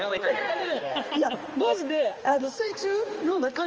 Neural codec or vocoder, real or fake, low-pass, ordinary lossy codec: codec, 16 kHz, 2 kbps, X-Codec, HuBERT features, trained on balanced general audio; fake; 7.2 kHz; Opus, 24 kbps